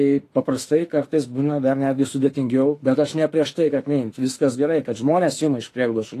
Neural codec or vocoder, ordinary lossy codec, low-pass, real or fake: autoencoder, 48 kHz, 32 numbers a frame, DAC-VAE, trained on Japanese speech; AAC, 48 kbps; 14.4 kHz; fake